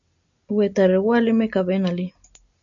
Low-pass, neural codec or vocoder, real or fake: 7.2 kHz; none; real